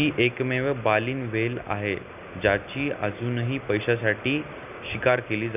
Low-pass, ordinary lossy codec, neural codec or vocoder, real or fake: 3.6 kHz; none; none; real